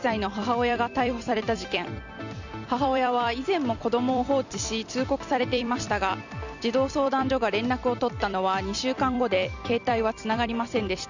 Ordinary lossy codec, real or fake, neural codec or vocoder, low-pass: none; fake; vocoder, 44.1 kHz, 128 mel bands every 256 samples, BigVGAN v2; 7.2 kHz